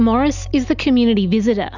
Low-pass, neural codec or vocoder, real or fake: 7.2 kHz; none; real